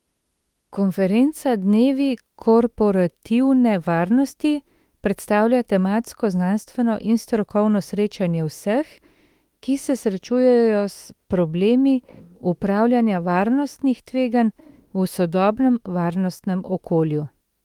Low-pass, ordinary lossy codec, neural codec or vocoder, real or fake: 19.8 kHz; Opus, 24 kbps; autoencoder, 48 kHz, 32 numbers a frame, DAC-VAE, trained on Japanese speech; fake